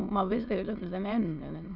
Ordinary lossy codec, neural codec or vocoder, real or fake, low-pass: none; autoencoder, 22.05 kHz, a latent of 192 numbers a frame, VITS, trained on many speakers; fake; 5.4 kHz